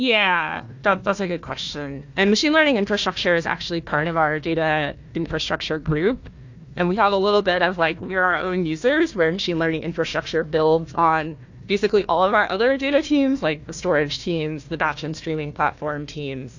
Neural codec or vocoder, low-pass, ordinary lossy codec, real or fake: codec, 16 kHz, 1 kbps, FunCodec, trained on Chinese and English, 50 frames a second; 7.2 kHz; AAC, 48 kbps; fake